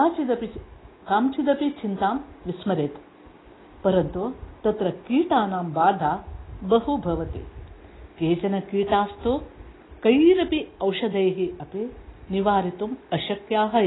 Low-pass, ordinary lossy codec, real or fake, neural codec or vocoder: 7.2 kHz; AAC, 16 kbps; real; none